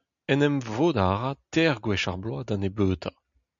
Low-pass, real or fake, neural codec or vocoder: 7.2 kHz; real; none